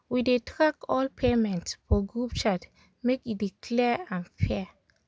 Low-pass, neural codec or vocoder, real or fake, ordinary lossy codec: none; none; real; none